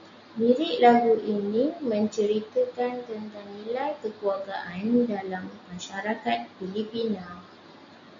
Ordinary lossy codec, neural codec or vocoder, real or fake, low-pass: MP3, 64 kbps; none; real; 7.2 kHz